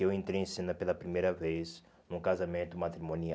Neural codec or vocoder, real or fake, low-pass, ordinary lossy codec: none; real; none; none